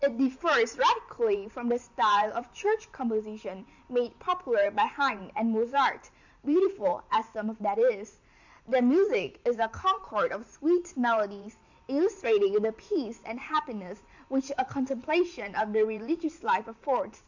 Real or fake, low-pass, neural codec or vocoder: fake; 7.2 kHz; vocoder, 44.1 kHz, 128 mel bands every 512 samples, BigVGAN v2